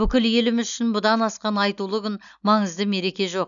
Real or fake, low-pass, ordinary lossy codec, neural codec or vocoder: real; 7.2 kHz; none; none